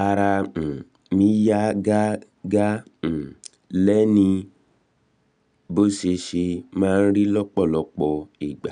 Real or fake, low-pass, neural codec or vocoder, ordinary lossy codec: real; 9.9 kHz; none; none